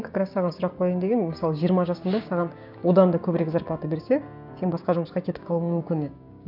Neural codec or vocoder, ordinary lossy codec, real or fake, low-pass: codec, 16 kHz, 6 kbps, DAC; none; fake; 5.4 kHz